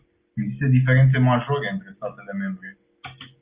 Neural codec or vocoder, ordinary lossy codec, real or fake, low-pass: none; Opus, 32 kbps; real; 3.6 kHz